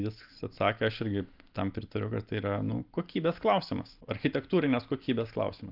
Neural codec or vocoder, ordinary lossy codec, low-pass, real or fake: none; Opus, 24 kbps; 5.4 kHz; real